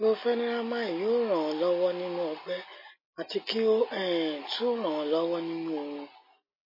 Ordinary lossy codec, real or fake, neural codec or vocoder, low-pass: MP3, 24 kbps; real; none; 5.4 kHz